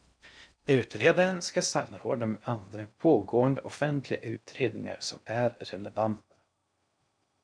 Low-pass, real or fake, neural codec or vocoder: 9.9 kHz; fake; codec, 16 kHz in and 24 kHz out, 0.6 kbps, FocalCodec, streaming, 2048 codes